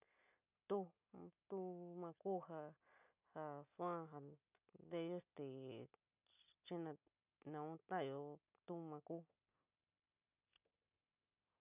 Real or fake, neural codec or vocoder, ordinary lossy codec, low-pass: real; none; none; 3.6 kHz